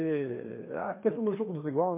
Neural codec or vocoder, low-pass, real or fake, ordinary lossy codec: codec, 16 kHz, 2 kbps, FreqCodec, larger model; 3.6 kHz; fake; MP3, 24 kbps